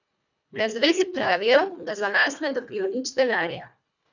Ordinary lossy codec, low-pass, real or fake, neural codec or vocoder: none; 7.2 kHz; fake; codec, 24 kHz, 1.5 kbps, HILCodec